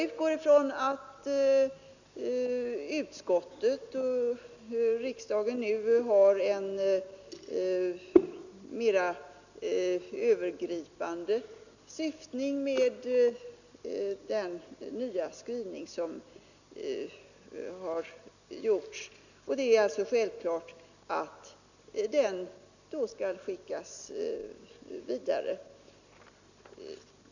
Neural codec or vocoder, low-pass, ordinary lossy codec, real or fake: none; 7.2 kHz; none; real